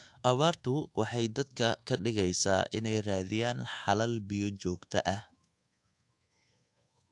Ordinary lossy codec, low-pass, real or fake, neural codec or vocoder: AAC, 64 kbps; 10.8 kHz; fake; codec, 24 kHz, 1.2 kbps, DualCodec